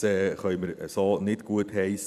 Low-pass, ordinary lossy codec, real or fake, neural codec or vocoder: 14.4 kHz; none; fake; vocoder, 44.1 kHz, 128 mel bands every 256 samples, BigVGAN v2